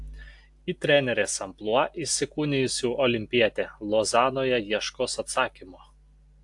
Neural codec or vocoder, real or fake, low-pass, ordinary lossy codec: none; real; 10.8 kHz; AAC, 64 kbps